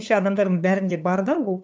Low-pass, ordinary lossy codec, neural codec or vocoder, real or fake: none; none; codec, 16 kHz, 2 kbps, FunCodec, trained on LibriTTS, 25 frames a second; fake